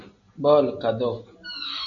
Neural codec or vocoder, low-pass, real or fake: none; 7.2 kHz; real